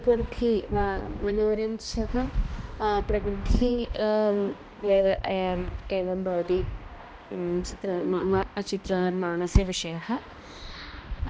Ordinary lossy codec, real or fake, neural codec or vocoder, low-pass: none; fake; codec, 16 kHz, 1 kbps, X-Codec, HuBERT features, trained on balanced general audio; none